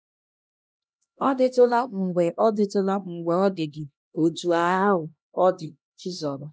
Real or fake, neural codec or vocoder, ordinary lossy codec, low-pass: fake; codec, 16 kHz, 1 kbps, X-Codec, HuBERT features, trained on LibriSpeech; none; none